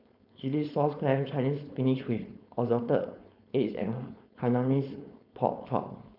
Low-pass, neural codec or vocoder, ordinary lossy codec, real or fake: 5.4 kHz; codec, 16 kHz, 4.8 kbps, FACodec; none; fake